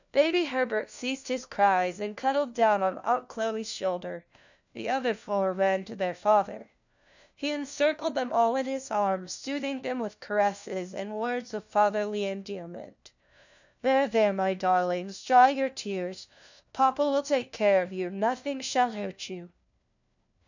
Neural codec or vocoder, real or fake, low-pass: codec, 16 kHz, 1 kbps, FunCodec, trained on LibriTTS, 50 frames a second; fake; 7.2 kHz